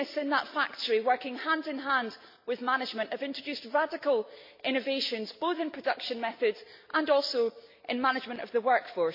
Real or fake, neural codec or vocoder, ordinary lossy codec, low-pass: real; none; MP3, 32 kbps; 5.4 kHz